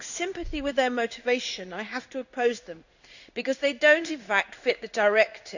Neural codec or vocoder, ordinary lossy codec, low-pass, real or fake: codec, 16 kHz in and 24 kHz out, 1 kbps, XY-Tokenizer; none; 7.2 kHz; fake